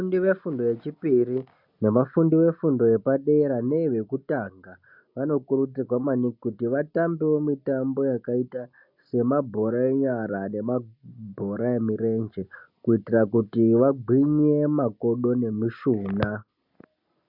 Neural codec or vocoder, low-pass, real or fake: none; 5.4 kHz; real